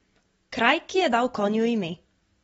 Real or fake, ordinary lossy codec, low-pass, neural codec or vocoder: real; AAC, 24 kbps; 19.8 kHz; none